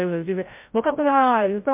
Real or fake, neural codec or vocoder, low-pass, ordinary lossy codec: fake; codec, 16 kHz, 0.5 kbps, FreqCodec, larger model; 3.6 kHz; MP3, 32 kbps